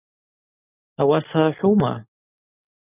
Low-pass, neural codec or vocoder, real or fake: 3.6 kHz; none; real